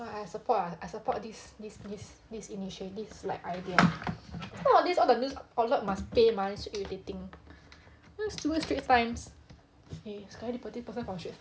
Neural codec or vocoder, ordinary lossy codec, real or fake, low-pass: none; none; real; none